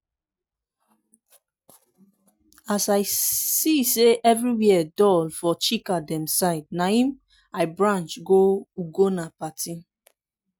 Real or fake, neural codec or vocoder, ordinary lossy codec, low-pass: real; none; none; none